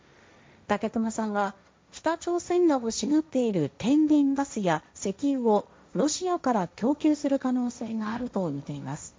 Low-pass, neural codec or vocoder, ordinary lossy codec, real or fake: none; codec, 16 kHz, 1.1 kbps, Voila-Tokenizer; none; fake